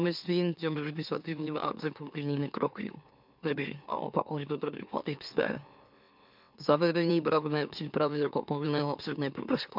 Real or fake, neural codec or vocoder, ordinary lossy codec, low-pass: fake; autoencoder, 44.1 kHz, a latent of 192 numbers a frame, MeloTTS; MP3, 48 kbps; 5.4 kHz